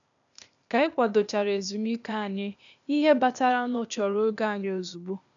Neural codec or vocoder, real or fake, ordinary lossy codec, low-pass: codec, 16 kHz, 0.8 kbps, ZipCodec; fake; none; 7.2 kHz